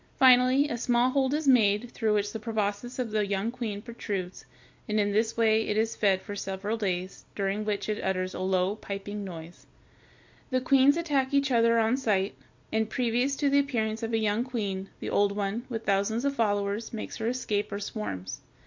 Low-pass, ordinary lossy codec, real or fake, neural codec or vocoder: 7.2 kHz; MP3, 48 kbps; real; none